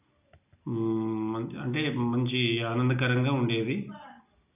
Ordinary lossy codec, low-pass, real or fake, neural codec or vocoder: none; 3.6 kHz; real; none